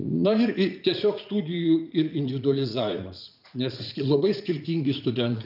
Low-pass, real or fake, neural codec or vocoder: 5.4 kHz; fake; vocoder, 44.1 kHz, 80 mel bands, Vocos